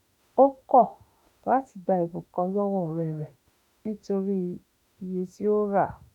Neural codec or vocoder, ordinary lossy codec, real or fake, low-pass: autoencoder, 48 kHz, 32 numbers a frame, DAC-VAE, trained on Japanese speech; none; fake; 19.8 kHz